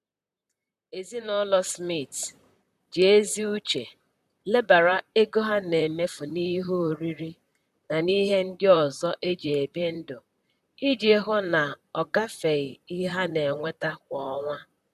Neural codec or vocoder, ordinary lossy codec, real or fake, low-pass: vocoder, 44.1 kHz, 128 mel bands, Pupu-Vocoder; none; fake; 14.4 kHz